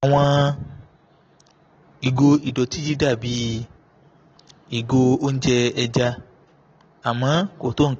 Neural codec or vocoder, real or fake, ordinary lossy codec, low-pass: none; real; AAC, 24 kbps; 7.2 kHz